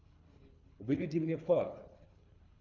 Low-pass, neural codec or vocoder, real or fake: 7.2 kHz; codec, 24 kHz, 3 kbps, HILCodec; fake